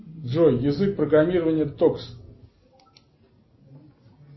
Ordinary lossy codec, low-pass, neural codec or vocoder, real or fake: MP3, 24 kbps; 7.2 kHz; none; real